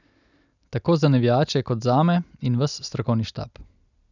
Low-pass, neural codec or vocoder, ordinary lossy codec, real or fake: 7.2 kHz; none; none; real